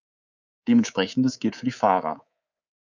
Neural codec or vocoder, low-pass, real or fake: codec, 24 kHz, 3.1 kbps, DualCodec; 7.2 kHz; fake